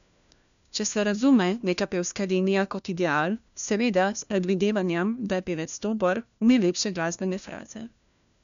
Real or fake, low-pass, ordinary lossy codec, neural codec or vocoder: fake; 7.2 kHz; none; codec, 16 kHz, 1 kbps, FunCodec, trained on LibriTTS, 50 frames a second